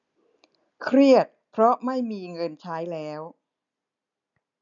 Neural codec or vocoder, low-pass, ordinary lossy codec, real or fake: none; 7.2 kHz; none; real